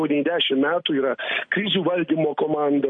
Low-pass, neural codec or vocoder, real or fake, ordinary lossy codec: 9.9 kHz; none; real; MP3, 48 kbps